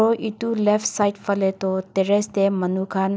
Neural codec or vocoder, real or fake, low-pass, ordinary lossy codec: none; real; none; none